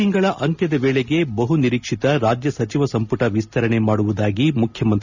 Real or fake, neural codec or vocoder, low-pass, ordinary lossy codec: real; none; 7.2 kHz; none